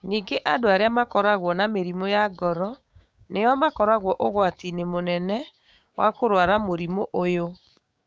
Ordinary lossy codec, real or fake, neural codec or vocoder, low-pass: none; fake; codec, 16 kHz, 6 kbps, DAC; none